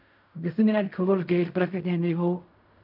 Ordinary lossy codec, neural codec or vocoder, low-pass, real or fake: AAC, 48 kbps; codec, 16 kHz in and 24 kHz out, 0.4 kbps, LongCat-Audio-Codec, fine tuned four codebook decoder; 5.4 kHz; fake